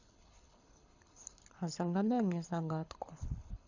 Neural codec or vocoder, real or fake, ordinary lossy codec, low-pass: codec, 24 kHz, 6 kbps, HILCodec; fake; none; 7.2 kHz